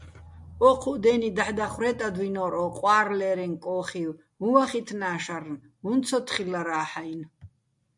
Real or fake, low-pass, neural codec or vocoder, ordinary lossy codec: real; 10.8 kHz; none; MP3, 64 kbps